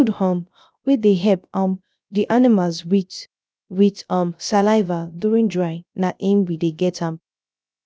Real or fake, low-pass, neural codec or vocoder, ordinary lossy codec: fake; none; codec, 16 kHz, 0.3 kbps, FocalCodec; none